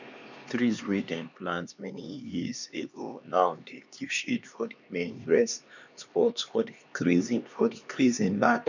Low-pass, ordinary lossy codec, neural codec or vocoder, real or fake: 7.2 kHz; none; codec, 16 kHz, 2 kbps, X-Codec, HuBERT features, trained on LibriSpeech; fake